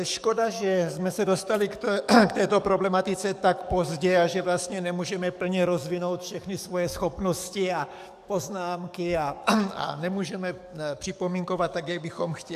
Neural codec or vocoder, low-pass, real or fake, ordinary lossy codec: codec, 44.1 kHz, 7.8 kbps, DAC; 14.4 kHz; fake; MP3, 96 kbps